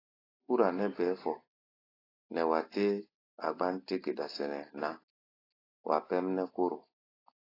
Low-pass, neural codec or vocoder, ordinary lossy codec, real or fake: 5.4 kHz; none; AAC, 24 kbps; real